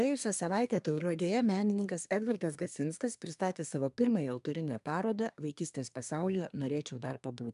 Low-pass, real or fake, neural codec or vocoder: 10.8 kHz; fake; codec, 24 kHz, 1 kbps, SNAC